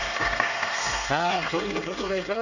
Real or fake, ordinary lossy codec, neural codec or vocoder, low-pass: fake; none; codec, 24 kHz, 1 kbps, SNAC; 7.2 kHz